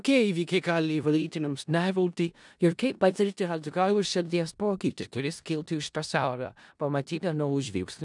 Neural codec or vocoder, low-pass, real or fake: codec, 16 kHz in and 24 kHz out, 0.4 kbps, LongCat-Audio-Codec, four codebook decoder; 10.8 kHz; fake